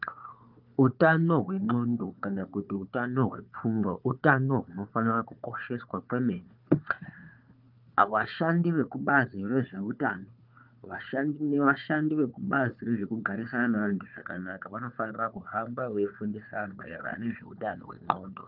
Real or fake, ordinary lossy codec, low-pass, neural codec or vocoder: fake; Opus, 24 kbps; 5.4 kHz; codec, 16 kHz, 4 kbps, FunCodec, trained on Chinese and English, 50 frames a second